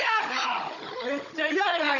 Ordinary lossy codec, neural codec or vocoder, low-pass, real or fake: none; codec, 16 kHz, 16 kbps, FunCodec, trained on Chinese and English, 50 frames a second; 7.2 kHz; fake